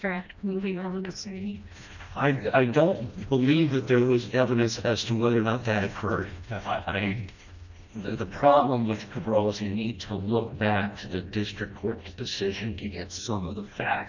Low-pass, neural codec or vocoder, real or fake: 7.2 kHz; codec, 16 kHz, 1 kbps, FreqCodec, smaller model; fake